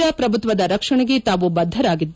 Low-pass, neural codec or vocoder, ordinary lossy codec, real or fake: none; none; none; real